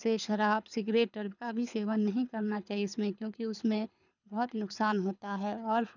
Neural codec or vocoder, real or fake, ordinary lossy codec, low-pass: codec, 24 kHz, 6 kbps, HILCodec; fake; none; 7.2 kHz